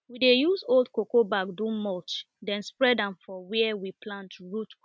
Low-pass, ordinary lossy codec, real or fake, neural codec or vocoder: none; none; real; none